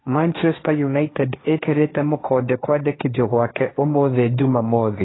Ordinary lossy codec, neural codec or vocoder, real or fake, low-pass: AAC, 16 kbps; codec, 16 kHz, 1.1 kbps, Voila-Tokenizer; fake; 7.2 kHz